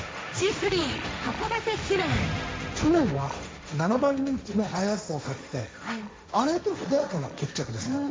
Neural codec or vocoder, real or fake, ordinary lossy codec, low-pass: codec, 16 kHz, 1.1 kbps, Voila-Tokenizer; fake; none; none